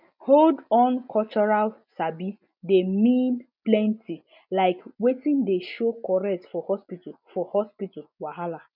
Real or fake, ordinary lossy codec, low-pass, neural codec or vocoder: real; none; 5.4 kHz; none